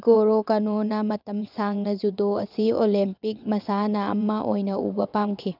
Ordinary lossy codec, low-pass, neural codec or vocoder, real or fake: none; 5.4 kHz; vocoder, 22.05 kHz, 80 mel bands, WaveNeXt; fake